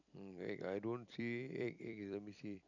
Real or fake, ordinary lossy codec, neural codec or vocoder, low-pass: fake; none; vocoder, 44.1 kHz, 128 mel bands every 256 samples, BigVGAN v2; 7.2 kHz